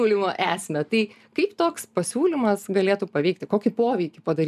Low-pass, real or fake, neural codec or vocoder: 14.4 kHz; real; none